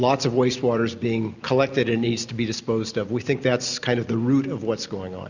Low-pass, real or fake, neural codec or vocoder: 7.2 kHz; real; none